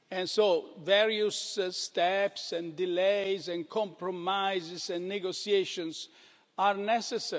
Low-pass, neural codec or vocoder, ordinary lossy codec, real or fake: none; none; none; real